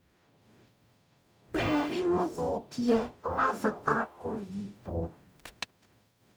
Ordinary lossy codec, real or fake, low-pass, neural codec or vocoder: none; fake; none; codec, 44.1 kHz, 0.9 kbps, DAC